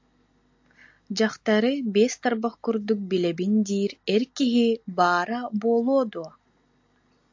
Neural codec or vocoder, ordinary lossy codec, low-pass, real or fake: none; AAC, 48 kbps; 7.2 kHz; real